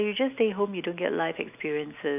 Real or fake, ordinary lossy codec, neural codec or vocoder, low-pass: real; none; none; 3.6 kHz